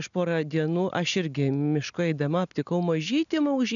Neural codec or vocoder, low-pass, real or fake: none; 7.2 kHz; real